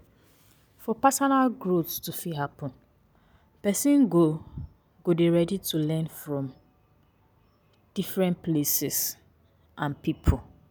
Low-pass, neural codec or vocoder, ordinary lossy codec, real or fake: none; none; none; real